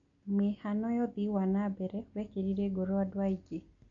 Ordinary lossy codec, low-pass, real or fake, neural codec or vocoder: AAC, 48 kbps; 7.2 kHz; real; none